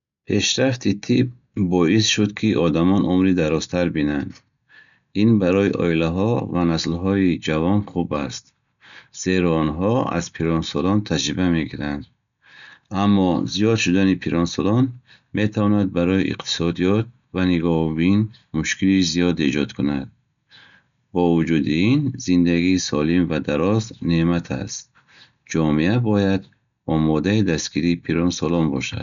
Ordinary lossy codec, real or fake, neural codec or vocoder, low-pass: none; real; none; 7.2 kHz